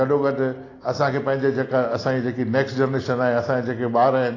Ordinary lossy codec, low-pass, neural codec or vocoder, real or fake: AAC, 32 kbps; 7.2 kHz; none; real